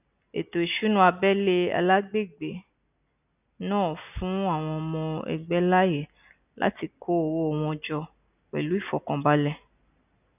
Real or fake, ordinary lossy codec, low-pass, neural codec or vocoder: real; none; 3.6 kHz; none